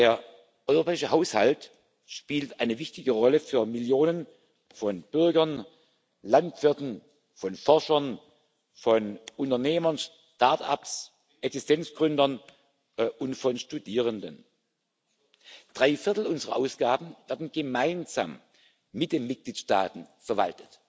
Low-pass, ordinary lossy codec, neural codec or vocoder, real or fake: none; none; none; real